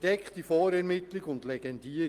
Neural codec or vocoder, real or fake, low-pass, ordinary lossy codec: none; real; 14.4 kHz; Opus, 24 kbps